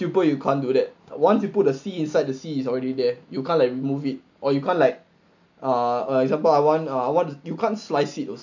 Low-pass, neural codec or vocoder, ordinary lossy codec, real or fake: 7.2 kHz; none; none; real